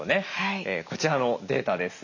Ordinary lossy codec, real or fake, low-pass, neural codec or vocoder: none; real; 7.2 kHz; none